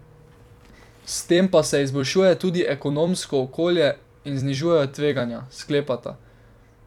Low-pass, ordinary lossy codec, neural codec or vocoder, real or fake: 19.8 kHz; none; vocoder, 44.1 kHz, 128 mel bands every 256 samples, BigVGAN v2; fake